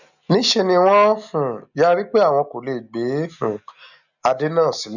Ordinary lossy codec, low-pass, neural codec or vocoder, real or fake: none; 7.2 kHz; none; real